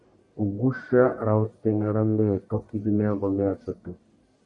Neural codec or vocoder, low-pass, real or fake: codec, 44.1 kHz, 1.7 kbps, Pupu-Codec; 10.8 kHz; fake